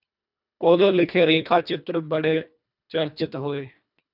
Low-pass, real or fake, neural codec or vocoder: 5.4 kHz; fake; codec, 24 kHz, 1.5 kbps, HILCodec